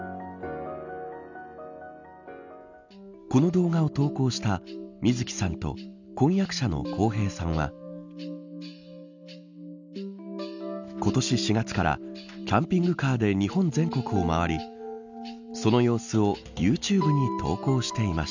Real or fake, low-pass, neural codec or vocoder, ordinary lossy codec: real; 7.2 kHz; none; none